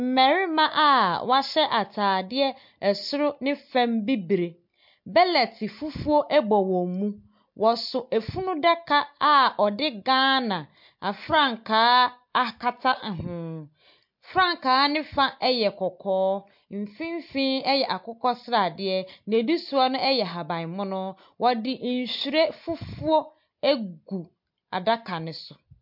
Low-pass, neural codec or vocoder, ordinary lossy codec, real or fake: 5.4 kHz; none; MP3, 48 kbps; real